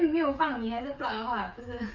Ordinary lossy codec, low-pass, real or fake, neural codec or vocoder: none; 7.2 kHz; fake; codec, 16 kHz, 8 kbps, FreqCodec, smaller model